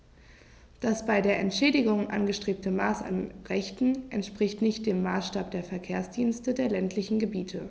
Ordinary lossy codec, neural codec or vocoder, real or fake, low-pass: none; none; real; none